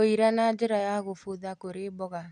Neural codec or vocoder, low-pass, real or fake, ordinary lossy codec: none; 10.8 kHz; real; none